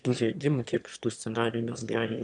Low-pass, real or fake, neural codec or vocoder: 9.9 kHz; fake; autoencoder, 22.05 kHz, a latent of 192 numbers a frame, VITS, trained on one speaker